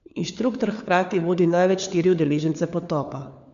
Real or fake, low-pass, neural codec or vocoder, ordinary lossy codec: fake; 7.2 kHz; codec, 16 kHz, 4 kbps, FunCodec, trained on LibriTTS, 50 frames a second; none